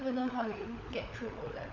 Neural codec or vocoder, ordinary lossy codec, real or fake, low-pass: codec, 16 kHz, 16 kbps, FunCodec, trained on LibriTTS, 50 frames a second; none; fake; 7.2 kHz